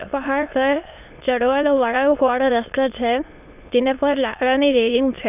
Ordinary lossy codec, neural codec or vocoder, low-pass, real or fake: none; autoencoder, 22.05 kHz, a latent of 192 numbers a frame, VITS, trained on many speakers; 3.6 kHz; fake